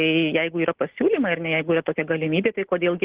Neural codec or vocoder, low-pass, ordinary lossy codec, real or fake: none; 3.6 kHz; Opus, 16 kbps; real